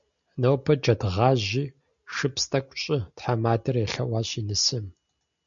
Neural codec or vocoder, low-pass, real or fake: none; 7.2 kHz; real